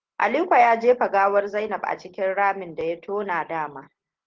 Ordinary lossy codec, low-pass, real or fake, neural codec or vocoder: Opus, 16 kbps; 7.2 kHz; real; none